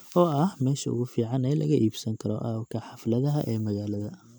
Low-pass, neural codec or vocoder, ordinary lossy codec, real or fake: none; none; none; real